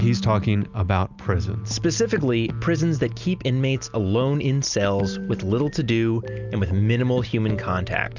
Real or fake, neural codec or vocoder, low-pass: real; none; 7.2 kHz